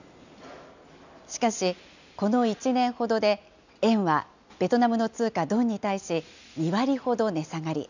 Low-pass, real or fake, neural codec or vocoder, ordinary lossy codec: 7.2 kHz; real; none; none